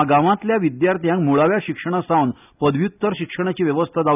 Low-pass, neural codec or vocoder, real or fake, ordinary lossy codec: 3.6 kHz; none; real; none